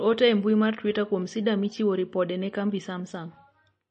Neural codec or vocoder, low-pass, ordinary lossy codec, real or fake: none; 9.9 kHz; MP3, 32 kbps; real